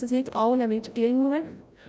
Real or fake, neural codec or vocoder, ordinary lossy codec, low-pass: fake; codec, 16 kHz, 0.5 kbps, FreqCodec, larger model; none; none